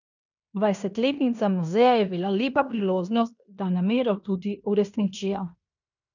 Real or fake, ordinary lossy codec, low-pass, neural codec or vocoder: fake; none; 7.2 kHz; codec, 16 kHz in and 24 kHz out, 0.9 kbps, LongCat-Audio-Codec, fine tuned four codebook decoder